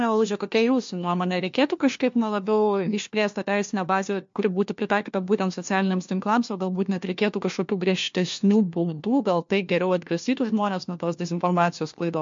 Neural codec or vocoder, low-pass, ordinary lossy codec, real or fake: codec, 16 kHz, 1 kbps, FunCodec, trained on LibriTTS, 50 frames a second; 7.2 kHz; MP3, 48 kbps; fake